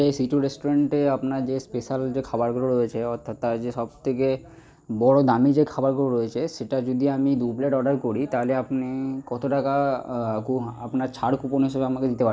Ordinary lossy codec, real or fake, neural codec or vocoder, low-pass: none; real; none; none